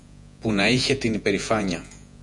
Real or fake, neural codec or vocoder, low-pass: fake; vocoder, 48 kHz, 128 mel bands, Vocos; 10.8 kHz